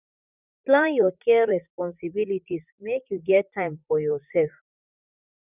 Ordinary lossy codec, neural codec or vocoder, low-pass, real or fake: none; vocoder, 44.1 kHz, 128 mel bands, Pupu-Vocoder; 3.6 kHz; fake